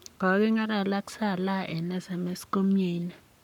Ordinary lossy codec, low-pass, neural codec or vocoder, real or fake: none; 19.8 kHz; codec, 44.1 kHz, 7.8 kbps, Pupu-Codec; fake